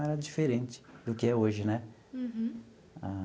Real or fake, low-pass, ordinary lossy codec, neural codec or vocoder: real; none; none; none